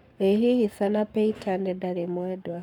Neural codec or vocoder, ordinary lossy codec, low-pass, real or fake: codec, 44.1 kHz, 7.8 kbps, Pupu-Codec; none; 19.8 kHz; fake